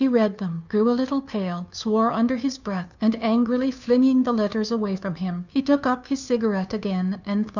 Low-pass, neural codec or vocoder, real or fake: 7.2 kHz; codec, 16 kHz, 2 kbps, FunCodec, trained on Chinese and English, 25 frames a second; fake